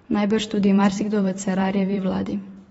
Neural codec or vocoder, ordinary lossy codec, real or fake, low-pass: vocoder, 44.1 kHz, 128 mel bands every 512 samples, BigVGAN v2; AAC, 24 kbps; fake; 19.8 kHz